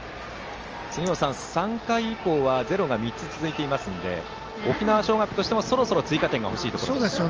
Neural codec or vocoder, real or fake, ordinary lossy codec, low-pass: none; real; Opus, 24 kbps; 7.2 kHz